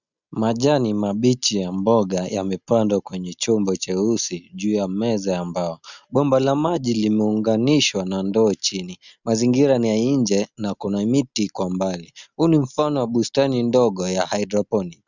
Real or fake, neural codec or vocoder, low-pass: real; none; 7.2 kHz